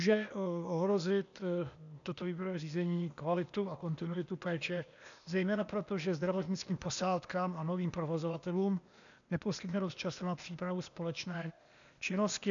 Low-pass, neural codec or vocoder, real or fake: 7.2 kHz; codec, 16 kHz, 0.8 kbps, ZipCodec; fake